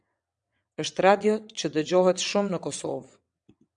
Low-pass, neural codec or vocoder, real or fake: 9.9 kHz; vocoder, 22.05 kHz, 80 mel bands, WaveNeXt; fake